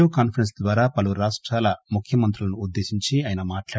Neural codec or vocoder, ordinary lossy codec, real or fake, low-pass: none; none; real; none